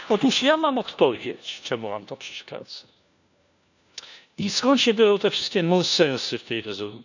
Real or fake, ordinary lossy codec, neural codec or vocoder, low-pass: fake; none; codec, 16 kHz, 1 kbps, FunCodec, trained on LibriTTS, 50 frames a second; 7.2 kHz